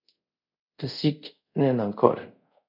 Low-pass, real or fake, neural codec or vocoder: 5.4 kHz; fake; codec, 24 kHz, 0.5 kbps, DualCodec